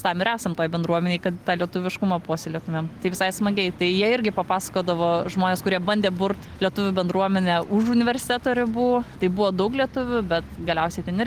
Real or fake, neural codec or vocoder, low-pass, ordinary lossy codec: real; none; 14.4 kHz; Opus, 24 kbps